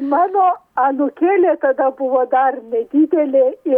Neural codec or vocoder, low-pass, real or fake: none; 19.8 kHz; real